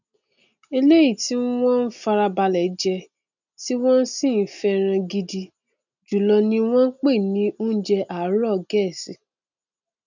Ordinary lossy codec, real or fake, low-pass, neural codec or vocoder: none; real; 7.2 kHz; none